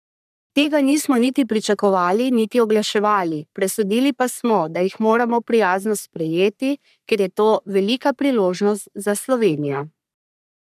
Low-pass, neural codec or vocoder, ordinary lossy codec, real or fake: 14.4 kHz; codec, 44.1 kHz, 3.4 kbps, Pupu-Codec; none; fake